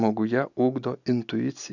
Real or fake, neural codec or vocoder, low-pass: real; none; 7.2 kHz